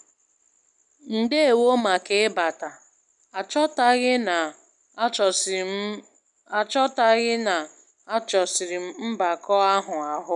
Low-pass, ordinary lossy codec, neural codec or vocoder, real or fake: 10.8 kHz; none; none; real